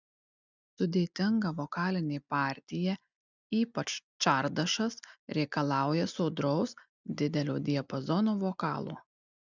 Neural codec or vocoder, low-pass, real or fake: none; 7.2 kHz; real